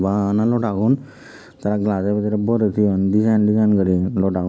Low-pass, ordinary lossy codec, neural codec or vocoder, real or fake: none; none; none; real